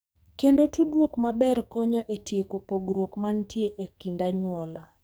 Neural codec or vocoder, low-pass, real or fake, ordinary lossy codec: codec, 44.1 kHz, 2.6 kbps, SNAC; none; fake; none